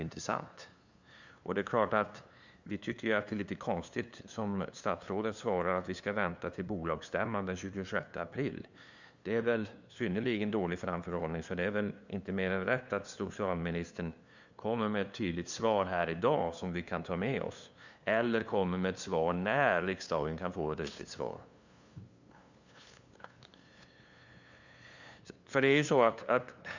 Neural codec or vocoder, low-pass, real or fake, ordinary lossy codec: codec, 16 kHz, 2 kbps, FunCodec, trained on LibriTTS, 25 frames a second; 7.2 kHz; fake; Opus, 64 kbps